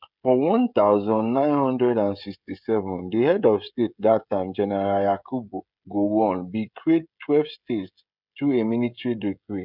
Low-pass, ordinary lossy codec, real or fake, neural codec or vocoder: 5.4 kHz; none; fake; codec, 16 kHz, 16 kbps, FreqCodec, smaller model